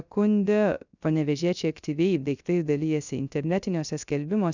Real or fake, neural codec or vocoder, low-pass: fake; codec, 16 kHz, 0.3 kbps, FocalCodec; 7.2 kHz